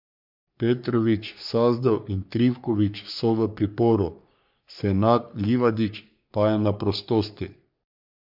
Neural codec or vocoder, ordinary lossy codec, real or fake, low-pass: codec, 44.1 kHz, 3.4 kbps, Pupu-Codec; MP3, 48 kbps; fake; 5.4 kHz